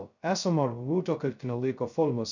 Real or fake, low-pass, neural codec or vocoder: fake; 7.2 kHz; codec, 16 kHz, 0.2 kbps, FocalCodec